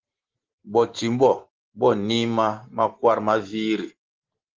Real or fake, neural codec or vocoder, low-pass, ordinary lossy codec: real; none; 7.2 kHz; Opus, 16 kbps